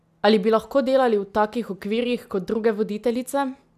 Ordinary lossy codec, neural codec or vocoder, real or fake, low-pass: none; none; real; 14.4 kHz